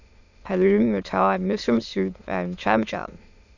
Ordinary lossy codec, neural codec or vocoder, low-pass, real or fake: none; autoencoder, 22.05 kHz, a latent of 192 numbers a frame, VITS, trained on many speakers; 7.2 kHz; fake